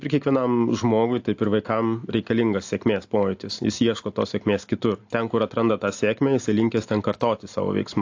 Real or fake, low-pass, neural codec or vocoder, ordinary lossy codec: real; 7.2 kHz; none; AAC, 48 kbps